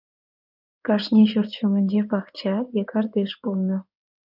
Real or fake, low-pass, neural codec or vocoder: fake; 5.4 kHz; codec, 16 kHz in and 24 kHz out, 2.2 kbps, FireRedTTS-2 codec